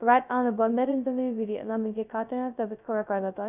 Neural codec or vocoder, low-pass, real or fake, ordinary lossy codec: codec, 16 kHz, 0.2 kbps, FocalCodec; 3.6 kHz; fake; none